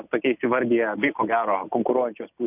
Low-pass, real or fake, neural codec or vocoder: 3.6 kHz; real; none